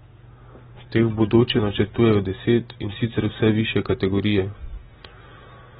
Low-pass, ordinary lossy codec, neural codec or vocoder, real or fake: 19.8 kHz; AAC, 16 kbps; none; real